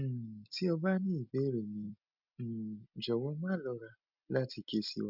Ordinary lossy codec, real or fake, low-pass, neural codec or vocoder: none; real; 5.4 kHz; none